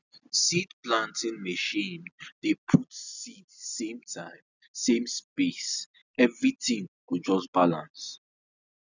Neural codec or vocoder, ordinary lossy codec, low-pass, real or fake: none; none; 7.2 kHz; real